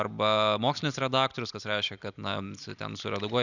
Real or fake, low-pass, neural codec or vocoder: real; 7.2 kHz; none